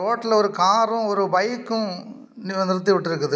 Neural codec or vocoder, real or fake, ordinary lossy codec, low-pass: none; real; none; none